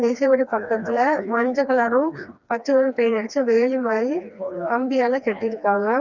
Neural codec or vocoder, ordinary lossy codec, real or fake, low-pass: codec, 16 kHz, 2 kbps, FreqCodec, smaller model; none; fake; 7.2 kHz